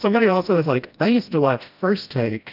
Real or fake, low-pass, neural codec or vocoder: fake; 5.4 kHz; codec, 16 kHz, 1 kbps, FreqCodec, smaller model